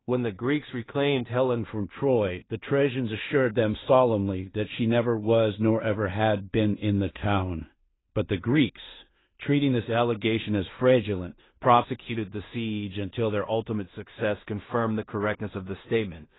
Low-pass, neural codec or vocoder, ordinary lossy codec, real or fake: 7.2 kHz; codec, 16 kHz in and 24 kHz out, 0.4 kbps, LongCat-Audio-Codec, two codebook decoder; AAC, 16 kbps; fake